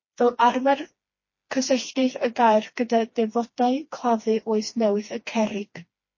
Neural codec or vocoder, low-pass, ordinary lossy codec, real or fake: codec, 16 kHz, 2 kbps, FreqCodec, smaller model; 7.2 kHz; MP3, 32 kbps; fake